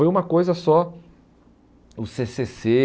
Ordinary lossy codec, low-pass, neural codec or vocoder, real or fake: none; none; none; real